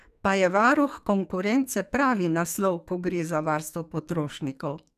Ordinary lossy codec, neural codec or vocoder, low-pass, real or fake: none; codec, 44.1 kHz, 2.6 kbps, SNAC; 14.4 kHz; fake